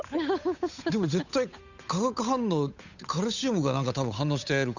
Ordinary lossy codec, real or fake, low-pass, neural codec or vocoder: none; fake; 7.2 kHz; codec, 16 kHz, 8 kbps, FunCodec, trained on Chinese and English, 25 frames a second